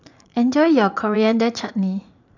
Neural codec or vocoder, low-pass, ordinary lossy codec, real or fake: vocoder, 44.1 kHz, 80 mel bands, Vocos; 7.2 kHz; none; fake